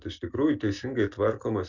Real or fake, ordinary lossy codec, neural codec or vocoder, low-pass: real; Opus, 64 kbps; none; 7.2 kHz